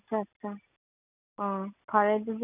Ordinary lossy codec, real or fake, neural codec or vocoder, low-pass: none; real; none; 3.6 kHz